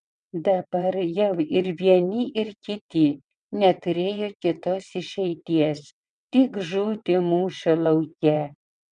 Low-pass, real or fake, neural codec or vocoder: 9.9 kHz; fake; vocoder, 22.05 kHz, 80 mel bands, WaveNeXt